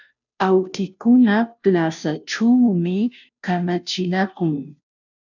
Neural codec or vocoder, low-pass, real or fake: codec, 16 kHz, 0.5 kbps, FunCodec, trained on Chinese and English, 25 frames a second; 7.2 kHz; fake